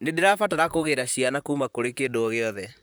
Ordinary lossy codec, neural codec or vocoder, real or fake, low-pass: none; vocoder, 44.1 kHz, 128 mel bands, Pupu-Vocoder; fake; none